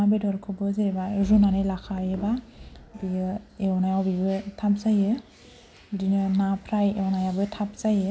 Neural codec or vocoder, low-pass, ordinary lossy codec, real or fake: none; none; none; real